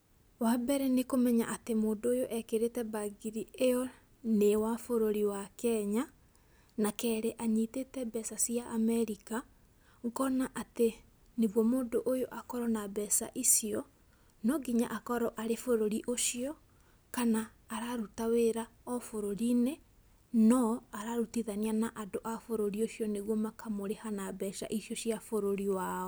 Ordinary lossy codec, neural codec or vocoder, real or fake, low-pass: none; none; real; none